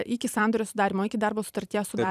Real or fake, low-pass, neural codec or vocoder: fake; 14.4 kHz; vocoder, 44.1 kHz, 128 mel bands every 256 samples, BigVGAN v2